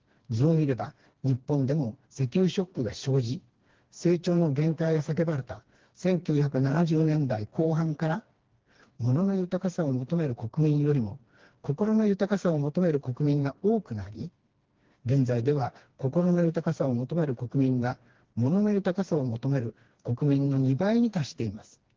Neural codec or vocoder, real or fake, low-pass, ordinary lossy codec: codec, 16 kHz, 2 kbps, FreqCodec, smaller model; fake; 7.2 kHz; Opus, 16 kbps